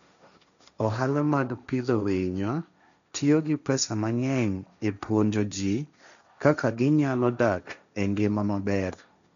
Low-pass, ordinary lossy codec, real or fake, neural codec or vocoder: 7.2 kHz; none; fake; codec, 16 kHz, 1.1 kbps, Voila-Tokenizer